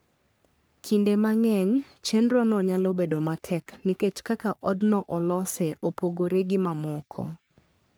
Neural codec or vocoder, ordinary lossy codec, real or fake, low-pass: codec, 44.1 kHz, 3.4 kbps, Pupu-Codec; none; fake; none